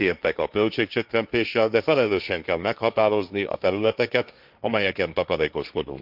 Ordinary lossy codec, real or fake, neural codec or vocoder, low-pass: none; fake; codec, 16 kHz, 1.1 kbps, Voila-Tokenizer; 5.4 kHz